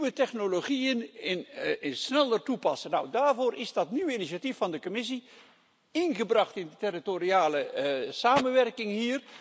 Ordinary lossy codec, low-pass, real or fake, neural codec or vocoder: none; none; real; none